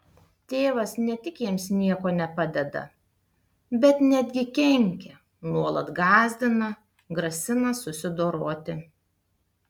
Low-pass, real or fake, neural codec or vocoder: 19.8 kHz; real; none